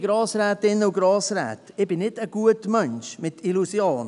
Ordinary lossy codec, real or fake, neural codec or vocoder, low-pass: none; real; none; 10.8 kHz